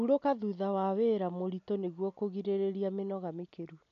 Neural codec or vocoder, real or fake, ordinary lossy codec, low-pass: none; real; none; 7.2 kHz